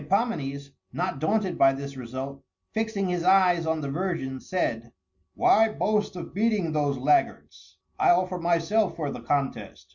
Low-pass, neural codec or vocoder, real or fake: 7.2 kHz; none; real